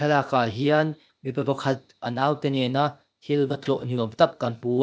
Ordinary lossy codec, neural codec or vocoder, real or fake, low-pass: none; codec, 16 kHz, 0.8 kbps, ZipCodec; fake; none